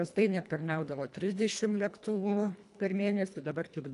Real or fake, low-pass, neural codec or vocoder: fake; 10.8 kHz; codec, 24 kHz, 1.5 kbps, HILCodec